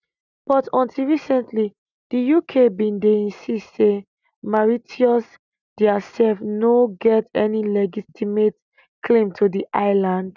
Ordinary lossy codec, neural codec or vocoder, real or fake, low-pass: none; none; real; 7.2 kHz